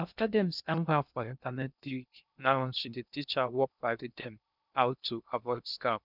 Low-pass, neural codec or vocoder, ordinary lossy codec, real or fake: 5.4 kHz; codec, 16 kHz in and 24 kHz out, 0.6 kbps, FocalCodec, streaming, 2048 codes; none; fake